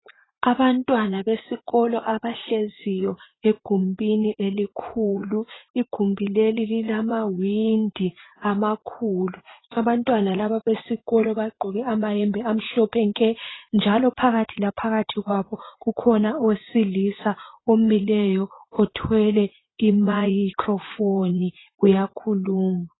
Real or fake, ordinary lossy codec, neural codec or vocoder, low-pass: fake; AAC, 16 kbps; vocoder, 44.1 kHz, 80 mel bands, Vocos; 7.2 kHz